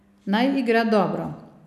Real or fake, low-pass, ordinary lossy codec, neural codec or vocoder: real; 14.4 kHz; none; none